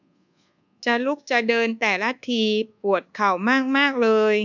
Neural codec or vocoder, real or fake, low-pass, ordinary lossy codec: codec, 24 kHz, 1.2 kbps, DualCodec; fake; 7.2 kHz; none